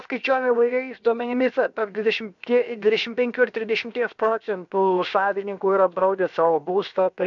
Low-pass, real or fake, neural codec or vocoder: 7.2 kHz; fake; codec, 16 kHz, 0.7 kbps, FocalCodec